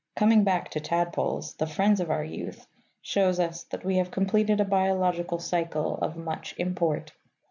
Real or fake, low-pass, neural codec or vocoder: real; 7.2 kHz; none